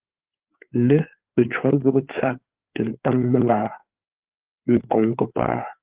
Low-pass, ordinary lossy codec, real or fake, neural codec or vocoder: 3.6 kHz; Opus, 16 kbps; fake; codec, 16 kHz, 4 kbps, FreqCodec, larger model